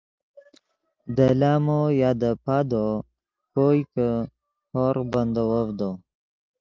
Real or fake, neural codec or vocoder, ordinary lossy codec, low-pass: real; none; Opus, 24 kbps; 7.2 kHz